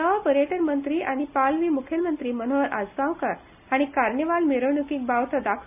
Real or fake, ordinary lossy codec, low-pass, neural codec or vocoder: real; none; 3.6 kHz; none